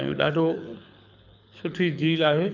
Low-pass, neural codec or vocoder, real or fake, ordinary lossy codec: 7.2 kHz; codec, 24 kHz, 6 kbps, HILCodec; fake; none